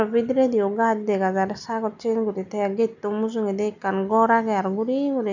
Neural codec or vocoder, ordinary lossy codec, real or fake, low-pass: none; none; real; 7.2 kHz